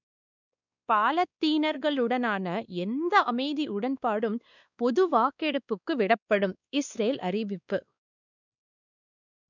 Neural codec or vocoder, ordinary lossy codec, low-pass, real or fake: codec, 16 kHz, 2 kbps, X-Codec, WavLM features, trained on Multilingual LibriSpeech; none; 7.2 kHz; fake